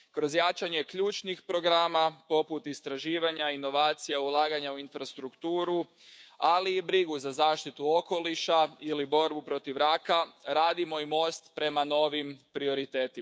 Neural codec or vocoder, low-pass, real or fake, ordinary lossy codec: codec, 16 kHz, 6 kbps, DAC; none; fake; none